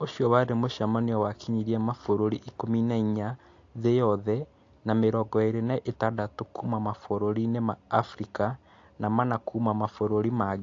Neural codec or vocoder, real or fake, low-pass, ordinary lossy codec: none; real; 7.2 kHz; AAC, 48 kbps